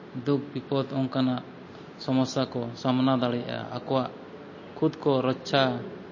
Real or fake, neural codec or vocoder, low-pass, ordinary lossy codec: real; none; 7.2 kHz; MP3, 32 kbps